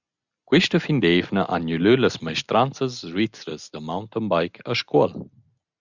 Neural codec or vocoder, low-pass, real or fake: none; 7.2 kHz; real